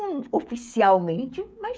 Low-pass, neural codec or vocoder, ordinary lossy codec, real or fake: none; codec, 16 kHz, 16 kbps, FreqCodec, smaller model; none; fake